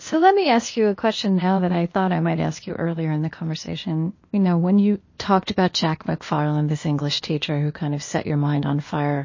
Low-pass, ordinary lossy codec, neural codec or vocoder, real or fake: 7.2 kHz; MP3, 32 kbps; codec, 16 kHz, 0.8 kbps, ZipCodec; fake